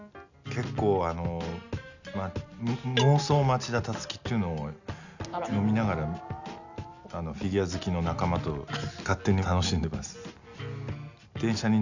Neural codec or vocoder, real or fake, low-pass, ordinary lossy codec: none; real; 7.2 kHz; none